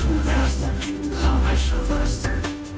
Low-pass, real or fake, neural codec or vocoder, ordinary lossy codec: none; fake; codec, 16 kHz, 0.5 kbps, FunCodec, trained on Chinese and English, 25 frames a second; none